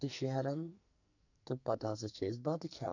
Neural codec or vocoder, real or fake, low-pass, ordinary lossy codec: codec, 44.1 kHz, 2.6 kbps, SNAC; fake; 7.2 kHz; none